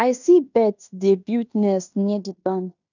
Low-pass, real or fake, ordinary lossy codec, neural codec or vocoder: 7.2 kHz; fake; none; codec, 16 kHz in and 24 kHz out, 0.9 kbps, LongCat-Audio-Codec, fine tuned four codebook decoder